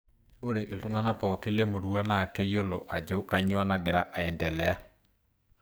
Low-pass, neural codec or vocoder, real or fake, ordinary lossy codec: none; codec, 44.1 kHz, 2.6 kbps, SNAC; fake; none